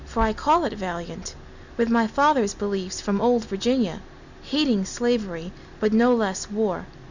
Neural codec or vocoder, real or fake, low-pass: none; real; 7.2 kHz